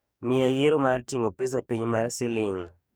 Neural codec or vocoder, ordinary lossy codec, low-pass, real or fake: codec, 44.1 kHz, 2.6 kbps, DAC; none; none; fake